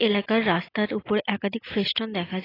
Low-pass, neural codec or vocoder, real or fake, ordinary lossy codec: 5.4 kHz; none; real; AAC, 24 kbps